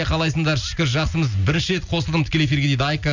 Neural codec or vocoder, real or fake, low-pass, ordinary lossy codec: none; real; 7.2 kHz; none